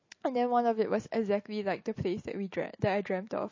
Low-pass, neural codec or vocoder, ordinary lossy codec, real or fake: 7.2 kHz; none; MP3, 32 kbps; real